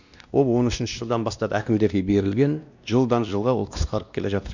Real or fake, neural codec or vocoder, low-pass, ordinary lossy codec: fake; codec, 16 kHz, 1 kbps, X-Codec, WavLM features, trained on Multilingual LibriSpeech; 7.2 kHz; none